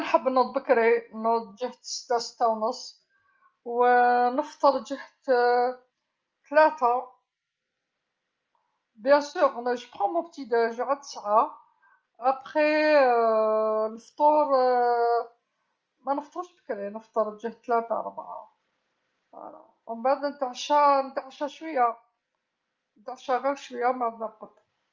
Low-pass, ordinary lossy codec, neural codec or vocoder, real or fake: 7.2 kHz; Opus, 24 kbps; none; real